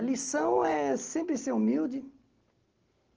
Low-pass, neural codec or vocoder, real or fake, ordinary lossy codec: 7.2 kHz; none; real; Opus, 16 kbps